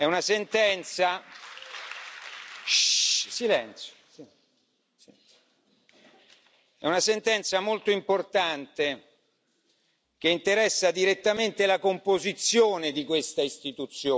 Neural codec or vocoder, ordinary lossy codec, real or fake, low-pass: none; none; real; none